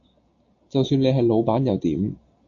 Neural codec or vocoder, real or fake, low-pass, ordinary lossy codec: none; real; 7.2 kHz; AAC, 48 kbps